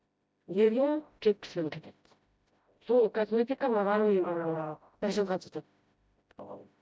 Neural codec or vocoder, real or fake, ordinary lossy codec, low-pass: codec, 16 kHz, 0.5 kbps, FreqCodec, smaller model; fake; none; none